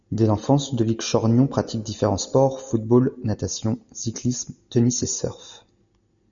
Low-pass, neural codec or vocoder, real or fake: 7.2 kHz; none; real